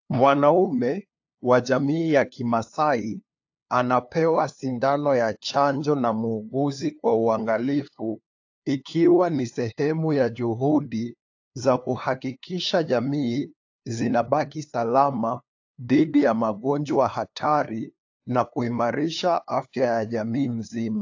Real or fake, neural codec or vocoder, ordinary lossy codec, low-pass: fake; codec, 16 kHz, 2 kbps, FunCodec, trained on LibriTTS, 25 frames a second; AAC, 48 kbps; 7.2 kHz